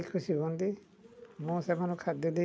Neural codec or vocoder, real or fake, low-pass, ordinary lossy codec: none; real; none; none